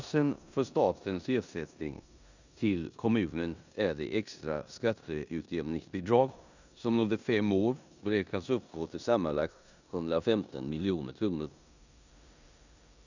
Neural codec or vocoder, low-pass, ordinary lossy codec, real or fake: codec, 16 kHz in and 24 kHz out, 0.9 kbps, LongCat-Audio-Codec, four codebook decoder; 7.2 kHz; none; fake